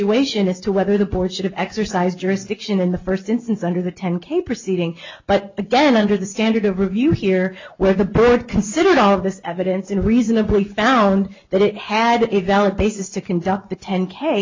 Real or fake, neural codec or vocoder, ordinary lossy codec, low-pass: real; none; MP3, 64 kbps; 7.2 kHz